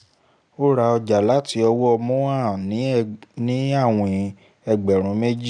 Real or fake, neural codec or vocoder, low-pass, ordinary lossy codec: real; none; 9.9 kHz; none